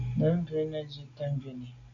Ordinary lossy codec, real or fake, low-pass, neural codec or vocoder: AAC, 48 kbps; real; 7.2 kHz; none